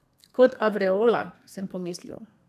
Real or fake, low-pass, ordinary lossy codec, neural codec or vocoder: fake; 14.4 kHz; none; codec, 32 kHz, 1.9 kbps, SNAC